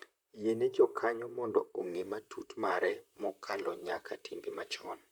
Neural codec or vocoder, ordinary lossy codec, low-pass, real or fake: vocoder, 44.1 kHz, 128 mel bands, Pupu-Vocoder; none; none; fake